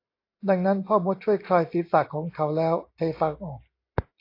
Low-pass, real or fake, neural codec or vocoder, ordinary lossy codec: 5.4 kHz; real; none; AAC, 32 kbps